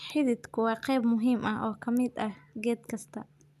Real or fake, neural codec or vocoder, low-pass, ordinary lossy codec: real; none; 14.4 kHz; none